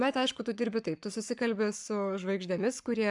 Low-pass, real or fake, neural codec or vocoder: 10.8 kHz; fake; codec, 44.1 kHz, 7.8 kbps, Pupu-Codec